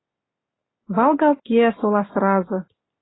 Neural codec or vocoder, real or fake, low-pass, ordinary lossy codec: none; real; 7.2 kHz; AAC, 16 kbps